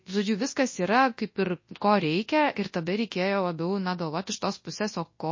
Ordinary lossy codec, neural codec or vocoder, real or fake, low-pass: MP3, 32 kbps; codec, 24 kHz, 0.9 kbps, WavTokenizer, large speech release; fake; 7.2 kHz